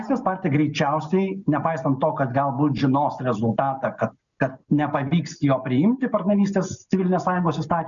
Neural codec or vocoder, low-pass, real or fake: none; 7.2 kHz; real